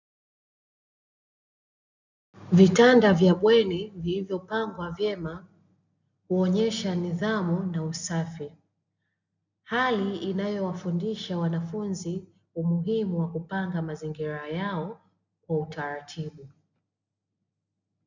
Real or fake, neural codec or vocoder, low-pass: real; none; 7.2 kHz